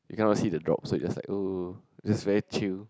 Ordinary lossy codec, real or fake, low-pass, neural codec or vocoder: none; real; none; none